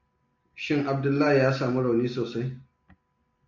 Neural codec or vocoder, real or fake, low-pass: none; real; 7.2 kHz